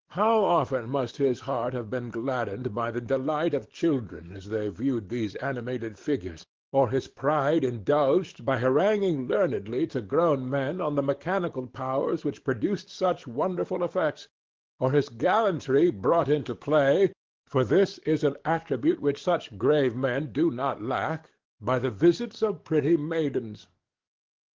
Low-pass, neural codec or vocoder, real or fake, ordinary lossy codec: 7.2 kHz; codec, 44.1 kHz, 7.8 kbps, DAC; fake; Opus, 16 kbps